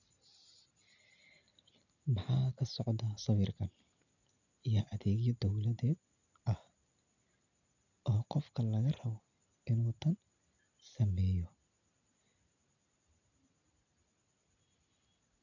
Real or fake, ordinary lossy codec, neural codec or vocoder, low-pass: real; none; none; 7.2 kHz